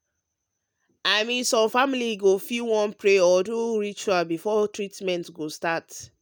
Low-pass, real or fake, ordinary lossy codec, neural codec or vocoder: none; real; none; none